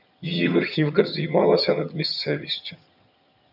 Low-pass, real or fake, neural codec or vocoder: 5.4 kHz; fake; vocoder, 22.05 kHz, 80 mel bands, HiFi-GAN